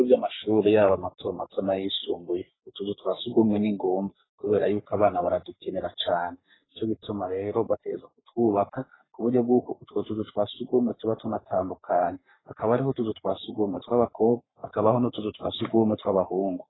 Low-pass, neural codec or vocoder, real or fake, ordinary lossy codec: 7.2 kHz; codec, 44.1 kHz, 3.4 kbps, Pupu-Codec; fake; AAC, 16 kbps